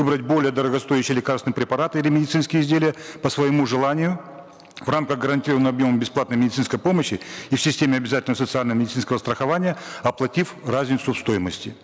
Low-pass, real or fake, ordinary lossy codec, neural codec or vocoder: none; real; none; none